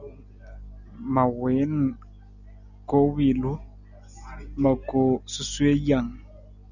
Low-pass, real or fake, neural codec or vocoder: 7.2 kHz; real; none